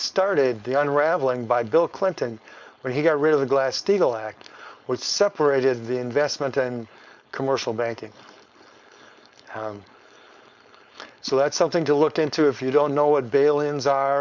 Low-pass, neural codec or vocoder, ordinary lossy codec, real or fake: 7.2 kHz; codec, 16 kHz, 4.8 kbps, FACodec; Opus, 64 kbps; fake